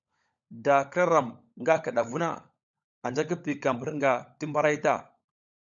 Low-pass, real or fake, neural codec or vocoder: 7.2 kHz; fake; codec, 16 kHz, 16 kbps, FunCodec, trained on LibriTTS, 50 frames a second